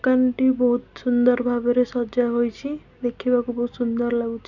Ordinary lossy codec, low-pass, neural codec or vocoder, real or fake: none; 7.2 kHz; none; real